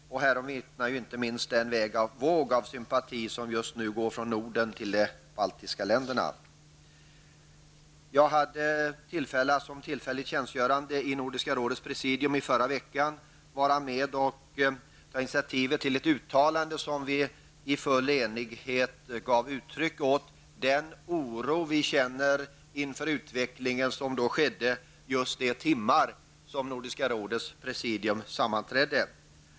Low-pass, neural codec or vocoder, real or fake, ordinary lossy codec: none; none; real; none